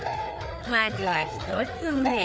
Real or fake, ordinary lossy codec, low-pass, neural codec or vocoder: fake; none; none; codec, 16 kHz, 4 kbps, FreqCodec, larger model